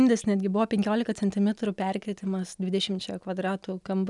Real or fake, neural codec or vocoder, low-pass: real; none; 10.8 kHz